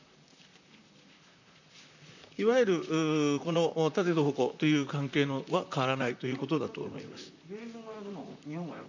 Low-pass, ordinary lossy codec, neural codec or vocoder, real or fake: 7.2 kHz; none; vocoder, 44.1 kHz, 128 mel bands, Pupu-Vocoder; fake